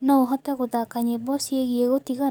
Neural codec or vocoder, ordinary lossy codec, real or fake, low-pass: codec, 44.1 kHz, 7.8 kbps, DAC; none; fake; none